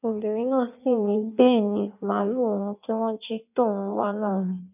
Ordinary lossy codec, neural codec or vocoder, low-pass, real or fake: none; codec, 16 kHz in and 24 kHz out, 1.1 kbps, FireRedTTS-2 codec; 3.6 kHz; fake